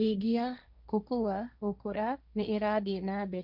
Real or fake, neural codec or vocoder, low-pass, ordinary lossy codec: fake; codec, 16 kHz, 1.1 kbps, Voila-Tokenizer; 5.4 kHz; none